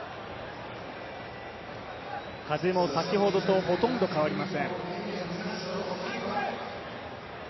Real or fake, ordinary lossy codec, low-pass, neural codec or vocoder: real; MP3, 24 kbps; 7.2 kHz; none